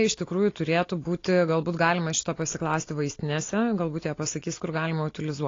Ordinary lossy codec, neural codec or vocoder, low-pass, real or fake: AAC, 32 kbps; none; 7.2 kHz; real